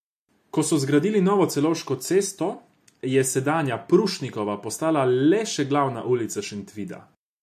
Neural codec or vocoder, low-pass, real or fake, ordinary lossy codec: none; 14.4 kHz; real; MP3, 64 kbps